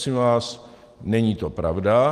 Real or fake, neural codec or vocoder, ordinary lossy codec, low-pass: real; none; Opus, 24 kbps; 14.4 kHz